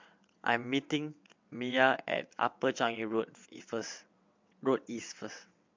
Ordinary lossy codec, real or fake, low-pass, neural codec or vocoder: MP3, 64 kbps; fake; 7.2 kHz; vocoder, 22.05 kHz, 80 mel bands, WaveNeXt